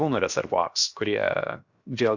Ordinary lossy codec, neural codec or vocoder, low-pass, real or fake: Opus, 64 kbps; codec, 16 kHz, 0.7 kbps, FocalCodec; 7.2 kHz; fake